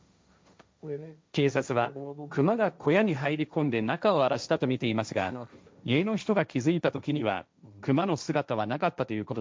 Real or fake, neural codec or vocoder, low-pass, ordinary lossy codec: fake; codec, 16 kHz, 1.1 kbps, Voila-Tokenizer; none; none